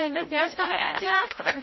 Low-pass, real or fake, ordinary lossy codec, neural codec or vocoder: 7.2 kHz; fake; MP3, 24 kbps; codec, 16 kHz, 0.5 kbps, FreqCodec, larger model